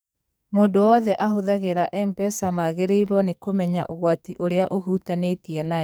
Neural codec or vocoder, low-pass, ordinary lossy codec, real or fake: codec, 44.1 kHz, 2.6 kbps, SNAC; none; none; fake